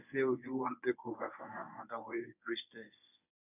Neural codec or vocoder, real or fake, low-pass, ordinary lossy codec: codec, 24 kHz, 0.9 kbps, WavTokenizer, medium speech release version 1; fake; 3.6 kHz; none